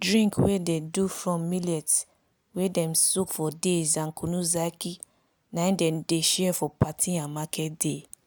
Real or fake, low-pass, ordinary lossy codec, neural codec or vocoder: real; none; none; none